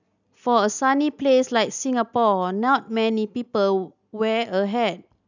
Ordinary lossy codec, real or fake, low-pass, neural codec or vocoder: none; real; 7.2 kHz; none